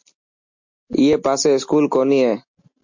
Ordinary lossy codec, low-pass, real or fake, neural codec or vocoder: MP3, 48 kbps; 7.2 kHz; real; none